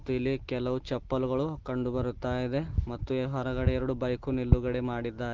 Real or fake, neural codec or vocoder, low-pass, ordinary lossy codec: real; none; 7.2 kHz; Opus, 16 kbps